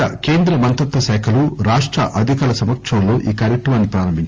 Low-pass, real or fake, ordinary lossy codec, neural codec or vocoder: 7.2 kHz; real; Opus, 24 kbps; none